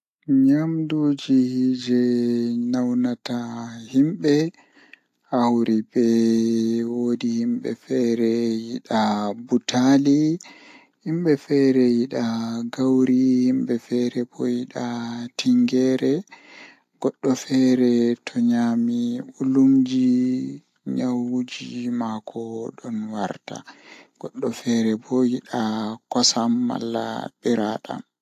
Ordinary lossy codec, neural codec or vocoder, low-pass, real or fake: AAC, 64 kbps; none; 14.4 kHz; real